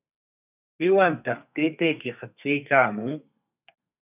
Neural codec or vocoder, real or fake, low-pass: codec, 32 kHz, 1.9 kbps, SNAC; fake; 3.6 kHz